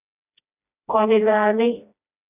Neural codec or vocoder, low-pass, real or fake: codec, 16 kHz, 1 kbps, FreqCodec, smaller model; 3.6 kHz; fake